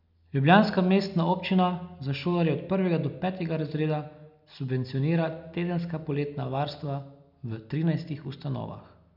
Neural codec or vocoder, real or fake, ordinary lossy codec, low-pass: none; real; none; 5.4 kHz